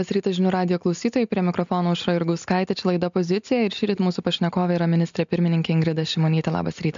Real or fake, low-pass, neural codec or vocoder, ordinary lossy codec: real; 7.2 kHz; none; MP3, 64 kbps